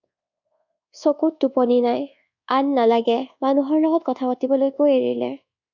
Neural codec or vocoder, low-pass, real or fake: codec, 24 kHz, 1.2 kbps, DualCodec; 7.2 kHz; fake